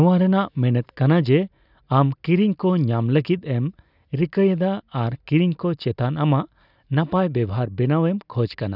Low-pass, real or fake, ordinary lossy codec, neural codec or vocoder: 5.4 kHz; real; none; none